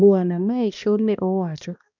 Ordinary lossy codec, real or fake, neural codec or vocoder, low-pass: none; fake; codec, 16 kHz, 1 kbps, X-Codec, HuBERT features, trained on balanced general audio; 7.2 kHz